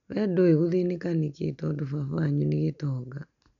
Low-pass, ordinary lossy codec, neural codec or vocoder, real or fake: 7.2 kHz; none; none; real